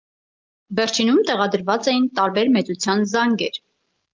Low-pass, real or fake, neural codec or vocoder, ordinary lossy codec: 7.2 kHz; real; none; Opus, 24 kbps